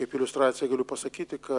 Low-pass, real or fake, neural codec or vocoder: 10.8 kHz; real; none